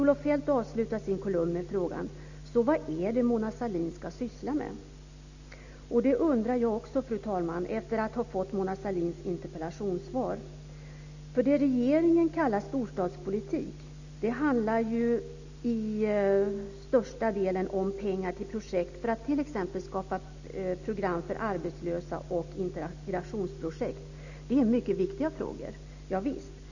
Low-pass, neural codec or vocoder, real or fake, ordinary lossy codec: 7.2 kHz; none; real; none